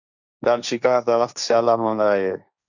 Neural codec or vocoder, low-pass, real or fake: codec, 16 kHz, 1.1 kbps, Voila-Tokenizer; 7.2 kHz; fake